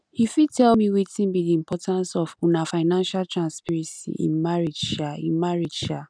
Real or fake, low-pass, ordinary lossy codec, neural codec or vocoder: real; 9.9 kHz; none; none